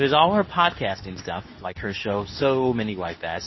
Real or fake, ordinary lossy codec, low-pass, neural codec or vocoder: fake; MP3, 24 kbps; 7.2 kHz; codec, 24 kHz, 0.9 kbps, WavTokenizer, medium speech release version 2